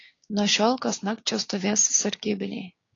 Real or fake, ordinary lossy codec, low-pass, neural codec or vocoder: fake; AAC, 32 kbps; 7.2 kHz; codec, 16 kHz, 6 kbps, DAC